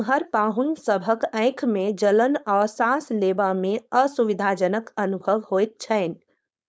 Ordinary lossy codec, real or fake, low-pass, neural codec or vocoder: none; fake; none; codec, 16 kHz, 4.8 kbps, FACodec